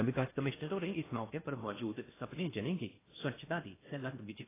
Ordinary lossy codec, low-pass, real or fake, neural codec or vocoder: AAC, 16 kbps; 3.6 kHz; fake; codec, 16 kHz in and 24 kHz out, 0.6 kbps, FocalCodec, streaming, 4096 codes